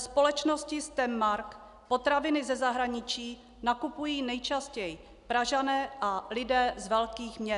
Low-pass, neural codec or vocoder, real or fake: 10.8 kHz; none; real